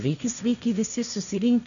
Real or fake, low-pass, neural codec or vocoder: fake; 7.2 kHz; codec, 16 kHz, 1.1 kbps, Voila-Tokenizer